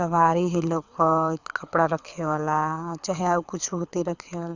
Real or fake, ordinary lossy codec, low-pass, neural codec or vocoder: fake; Opus, 64 kbps; 7.2 kHz; codec, 24 kHz, 6 kbps, HILCodec